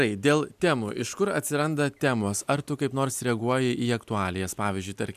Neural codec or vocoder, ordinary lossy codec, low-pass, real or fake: none; MP3, 96 kbps; 14.4 kHz; real